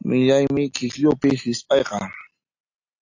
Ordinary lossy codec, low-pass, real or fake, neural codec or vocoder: AAC, 48 kbps; 7.2 kHz; real; none